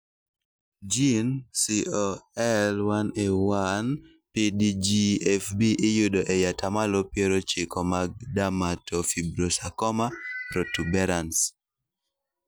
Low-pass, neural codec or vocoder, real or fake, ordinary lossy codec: none; none; real; none